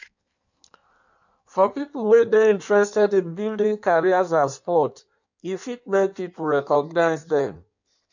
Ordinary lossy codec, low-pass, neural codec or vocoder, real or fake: none; 7.2 kHz; codec, 16 kHz in and 24 kHz out, 1.1 kbps, FireRedTTS-2 codec; fake